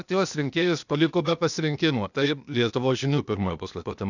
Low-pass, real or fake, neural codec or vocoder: 7.2 kHz; fake; codec, 16 kHz, 0.8 kbps, ZipCodec